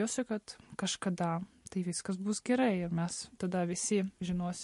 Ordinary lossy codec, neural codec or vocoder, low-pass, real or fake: MP3, 48 kbps; none; 14.4 kHz; real